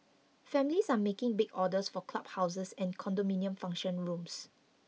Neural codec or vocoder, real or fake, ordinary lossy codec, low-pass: none; real; none; none